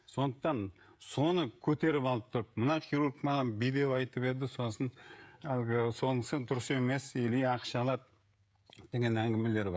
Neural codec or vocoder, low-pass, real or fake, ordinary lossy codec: codec, 16 kHz, 16 kbps, FreqCodec, smaller model; none; fake; none